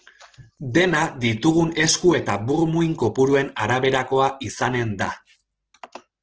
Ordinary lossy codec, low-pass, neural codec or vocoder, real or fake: Opus, 16 kbps; 7.2 kHz; none; real